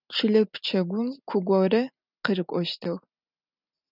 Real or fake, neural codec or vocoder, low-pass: real; none; 5.4 kHz